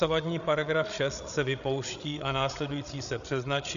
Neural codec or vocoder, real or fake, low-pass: codec, 16 kHz, 16 kbps, FreqCodec, larger model; fake; 7.2 kHz